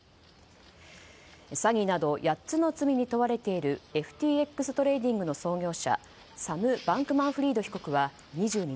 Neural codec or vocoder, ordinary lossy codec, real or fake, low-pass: none; none; real; none